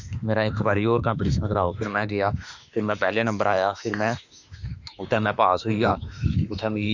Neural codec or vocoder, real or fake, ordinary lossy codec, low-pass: autoencoder, 48 kHz, 32 numbers a frame, DAC-VAE, trained on Japanese speech; fake; none; 7.2 kHz